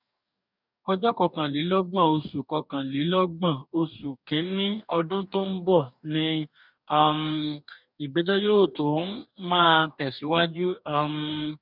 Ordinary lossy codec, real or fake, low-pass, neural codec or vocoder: none; fake; 5.4 kHz; codec, 44.1 kHz, 2.6 kbps, DAC